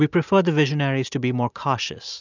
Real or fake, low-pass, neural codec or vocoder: real; 7.2 kHz; none